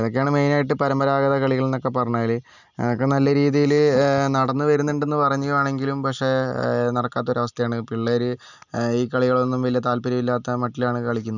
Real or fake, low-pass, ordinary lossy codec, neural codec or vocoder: real; 7.2 kHz; none; none